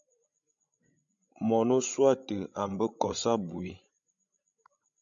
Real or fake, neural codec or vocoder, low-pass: fake; codec, 16 kHz, 16 kbps, FreqCodec, larger model; 7.2 kHz